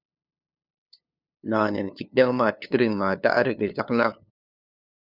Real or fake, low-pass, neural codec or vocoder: fake; 5.4 kHz; codec, 16 kHz, 2 kbps, FunCodec, trained on LibriTTS, 25 frames a second